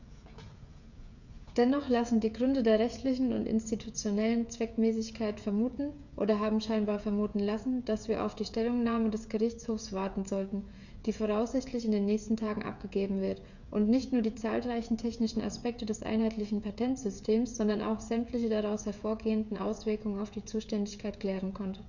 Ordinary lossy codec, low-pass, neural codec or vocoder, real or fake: none; 7.2 kHz; codec, 16 kHz, 16 kbps, FreqCodec, smaller model; fake